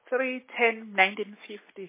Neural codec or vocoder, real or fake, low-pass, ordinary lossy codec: none; real; 3.6 kHz; MP3, 16 kbps